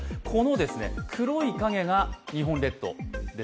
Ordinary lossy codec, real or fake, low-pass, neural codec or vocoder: none; real; none; none